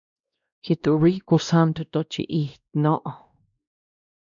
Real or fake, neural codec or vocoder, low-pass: fake; codec, 16 kHz, 1 kbps, X-Codec, WavLM features, trained on Multilingual LibriSpeech; 7.2 kHz